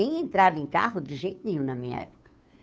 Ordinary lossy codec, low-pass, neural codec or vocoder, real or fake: none; none; codec, 16 kHz, 2 kbps, FunCodec, trained on Chinese and English, 25 frames a second; fake